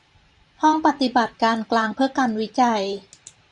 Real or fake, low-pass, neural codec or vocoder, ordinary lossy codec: fake; 10.8 kHz; vocoder, 44.1 kHz, 128 mel bands every 512 samples, BigVGAN v2; Opus, 64 kbps